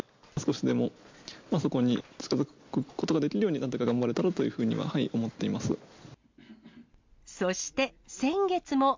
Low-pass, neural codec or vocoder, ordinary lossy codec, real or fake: 7.2 kHz; none; MP3, 64 kbps; real